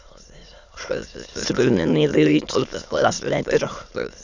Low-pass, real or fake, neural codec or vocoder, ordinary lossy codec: 7.2 kHz; fake; autoencoder, 22.05 kHz, a latent of 192 numbers a frame, VITS, trained on many speakers; none